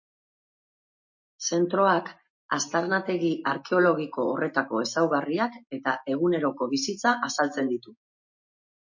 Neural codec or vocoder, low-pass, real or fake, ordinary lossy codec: none; 7.2 kHz; real; MP3, 32 kbps